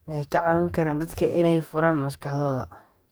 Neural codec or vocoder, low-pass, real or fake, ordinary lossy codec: codec, 44.1 kHz, 2.6 kbps, DAC; none; fake; none